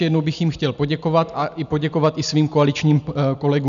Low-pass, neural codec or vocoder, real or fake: 7.2 kHz; none; real